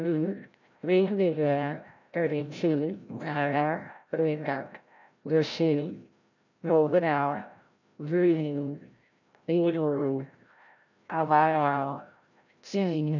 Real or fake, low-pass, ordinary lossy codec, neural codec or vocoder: fake; 7.2 kHz; none; codec, 16 kHz, 0.5 kbps, FreqCodec, larger model